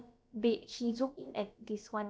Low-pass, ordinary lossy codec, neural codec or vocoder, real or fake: none; none; codec, 16 kHz, about 1 kbps, DyCAST, with the encoder's durations; fake